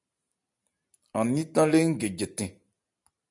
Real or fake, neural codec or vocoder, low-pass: real; none; 10.8 kHz